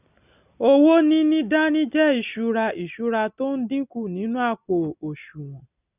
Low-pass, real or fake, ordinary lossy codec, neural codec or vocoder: 3.6 kHz; real; none; none